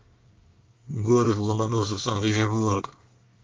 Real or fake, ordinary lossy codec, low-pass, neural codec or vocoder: fake; Opus, 32 kbps; 7.2 kHz; codec, 24 kHz, 1 kbps, SNAC